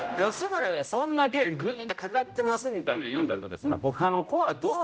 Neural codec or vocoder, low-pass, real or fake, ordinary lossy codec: codec, 16 kHz, 0.5 kbps, X-Codec, HuBERT features, trained on general audio; none; fake; none